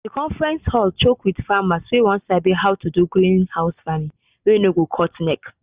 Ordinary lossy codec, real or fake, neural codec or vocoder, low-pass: Opus, 64 kbps; real; none; 3.6 kHz